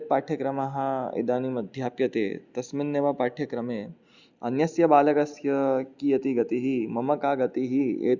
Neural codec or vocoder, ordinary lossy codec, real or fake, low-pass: none; Opus, 64 kbps; real; 7.2 kHz